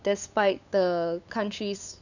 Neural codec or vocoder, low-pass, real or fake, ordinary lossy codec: codec, 16 kHz, 8 kbps, FunCodec, trained on LibriTTS, 25 frames a second; 7.2 kHz; fake; none